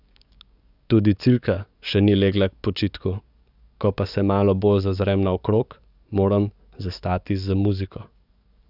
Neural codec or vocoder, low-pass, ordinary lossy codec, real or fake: codec, 44.1 kHz, 7.8 kbps, DAC; 5.4 kHz; none; fake